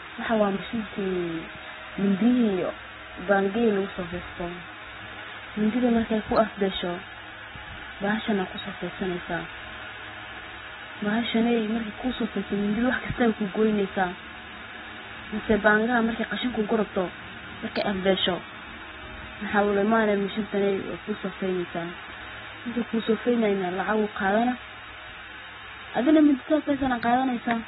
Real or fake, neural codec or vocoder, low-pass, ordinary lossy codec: real; none; 14.4 kHz; AAC, 16 kbps